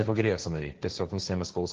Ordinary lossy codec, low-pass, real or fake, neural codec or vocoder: Opus, 16 kbps; 7.2 kHz; fake; codec, 16 kHz, 1.1 kbps, Voila-Tokenizer